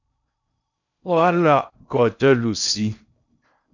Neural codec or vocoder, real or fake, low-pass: codec, 16 kHz in and 24 kHz out, 0.6 kbps, FocalCodec, streaming, 4096 codes; fake; 7.2 kHz